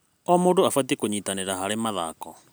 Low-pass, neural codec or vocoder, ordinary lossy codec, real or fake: none; none; none; real